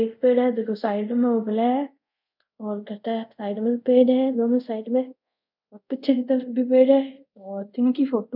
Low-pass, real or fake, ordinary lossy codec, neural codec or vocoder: 5.4 kHz; fake; none; codec, 24 kHz, 0.5 kbps, DualCodec